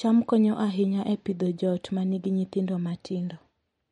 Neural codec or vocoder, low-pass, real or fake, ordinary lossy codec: none; 19.8 kHz; real; MP3, 48 kbps